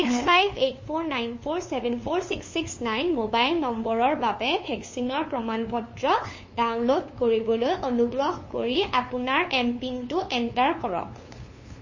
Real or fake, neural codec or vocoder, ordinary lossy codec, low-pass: fake; codec, 16 kHz, 2 kbps, FunCodec, trained on LibriTTS, 25 frames a second; MP3, 32 kbps; 7.2 kHz